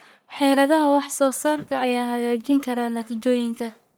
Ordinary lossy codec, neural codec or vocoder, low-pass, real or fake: none; codec, 44.1 kHz, 1.7 kbps, Pupu-Codec; none; fake